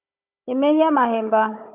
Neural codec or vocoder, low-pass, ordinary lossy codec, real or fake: codec, 16 kHz, 16 kbps, FunCodec, trained on Chinese and English, 50 frames a second; 3.6 kHz; AAC, 32 kbps; fake